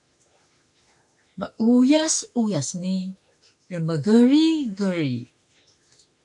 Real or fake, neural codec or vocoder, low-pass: fake; autoencoder, 48 kHz, 32 numbers a frame, DAC-VAE, trained on Japanese speech; 10.8 kHz